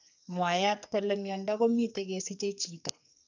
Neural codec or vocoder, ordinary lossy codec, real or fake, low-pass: codec, 44.1 kHz, 2.6 kbps, SNAC; none; fake; 7.2 kHz